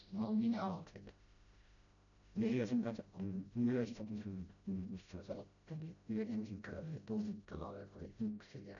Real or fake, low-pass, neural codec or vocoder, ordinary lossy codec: fake; 7.2 kHz; codec, 16 kHz, 0.5 kbps, FreqCodec, smaller model; none